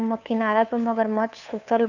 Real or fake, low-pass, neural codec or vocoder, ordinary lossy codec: fake; 7.2 kHz; codec, 16 kHz, 2 kbps, FunCodec, trained on Chinese and English, 25 frames a second; none